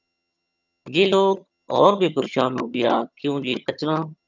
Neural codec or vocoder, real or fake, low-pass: vocoder, 22.05 kHz, 80 mel bands, HiFi-GAN; fake; 7.2 kHz